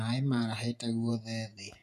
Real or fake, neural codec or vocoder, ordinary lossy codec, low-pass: fake; vocoder, 48 kHz, 128 mel bands, Vocos; none; 14.4 kHz